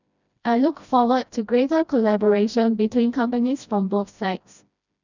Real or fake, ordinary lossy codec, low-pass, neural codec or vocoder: fake; none; 7.2 kHz; codec, 16 kHz, 1 kbps, FreqCodec, smaller model